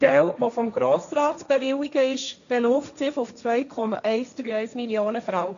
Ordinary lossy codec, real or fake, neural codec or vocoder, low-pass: none; fake; codec, 16 kHz, 1.1 kbps, Voila-Tokenizer; 7.2 kHz